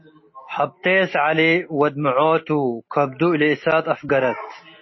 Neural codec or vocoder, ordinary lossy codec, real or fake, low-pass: none; MP3, 24 kbps; real; 7.2 kHz